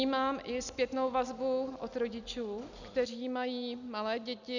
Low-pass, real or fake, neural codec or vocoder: 7.2 kHz; real; none